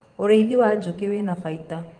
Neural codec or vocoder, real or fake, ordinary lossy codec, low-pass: vocoder, 22.05 kHz, 80 mel bands, Vocos; fake; Opus, 24 kbps; 9.9 kHz